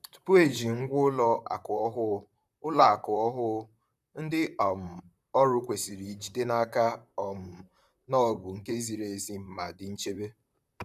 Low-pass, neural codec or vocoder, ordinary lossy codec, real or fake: 14.4 kHz; vocoder, 44.1 kHz, 128 mel bands, Pupu-Vocoder; none; fake